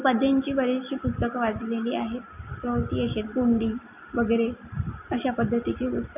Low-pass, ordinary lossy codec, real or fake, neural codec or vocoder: 3.6 kHz; none; real; none